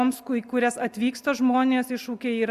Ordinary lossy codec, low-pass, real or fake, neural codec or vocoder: Opus, 64 kbps; 14.4 kHz; real; none